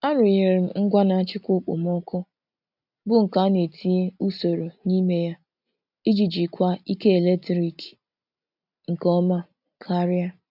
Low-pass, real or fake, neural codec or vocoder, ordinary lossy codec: 5.4 kHz; real; none; none